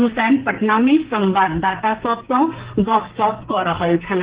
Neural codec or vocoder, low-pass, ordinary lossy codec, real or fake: codec, 44.1 kHz, 2.6 kbps, SNAC; 3.6 kHz; Opus, 16 kbps; fake